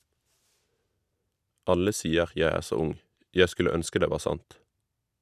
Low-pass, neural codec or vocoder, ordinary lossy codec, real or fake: 14.4 kHz; none; none; real